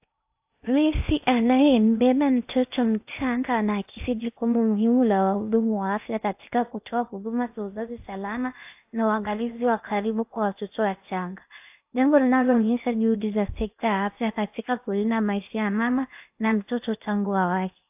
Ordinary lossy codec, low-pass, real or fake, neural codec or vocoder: AAC, 32 kbps; 3.6 kHz; fake; codec, 16 kHz in and 24 kHz out, 0.6 kbps, FocalCodec, streaming, 2048 codes